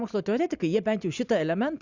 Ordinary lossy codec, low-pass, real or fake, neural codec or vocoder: Opus, 64 kbps; 7.2 kHz; real; none